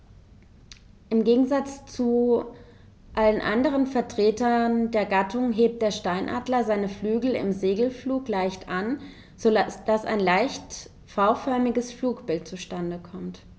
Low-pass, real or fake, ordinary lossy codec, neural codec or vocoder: none; real; none; none